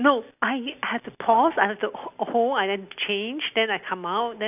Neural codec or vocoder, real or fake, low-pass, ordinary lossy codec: none; real; 3.6 kHz; none